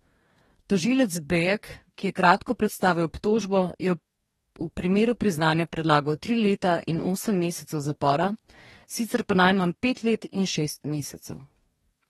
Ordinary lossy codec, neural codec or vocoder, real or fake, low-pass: AAC, 32 kbps; codec, 44.1 kHz, 2.6 kbps, DAC; fake; 19.8 kHz